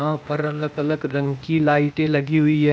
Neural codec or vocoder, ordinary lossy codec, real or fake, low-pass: codec, 16 kHz, 0.8 kbps, ZipCodec; none; fake; none